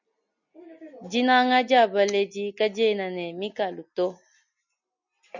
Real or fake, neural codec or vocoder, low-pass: real; none; 7.2 kHz